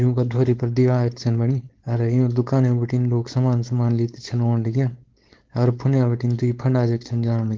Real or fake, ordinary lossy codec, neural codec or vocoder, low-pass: fake; Opus, 16 kbps; codec, 16 kHz, 4.8 kbps, FACodec; 7.2 kHz